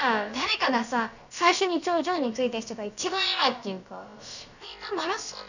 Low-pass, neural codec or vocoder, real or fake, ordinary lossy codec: 7.2 kHz; codec, 16 kHz, about 1 kbps, DyCAST, with the encoder's durations; fake; none